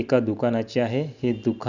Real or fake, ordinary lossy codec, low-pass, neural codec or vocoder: real; none; 7.2 kHz; none